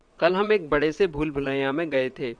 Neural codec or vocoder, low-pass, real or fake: vocoder, 22.05 kHz, 80 mel bands, WaveNeXt; 9.9 kHz; fake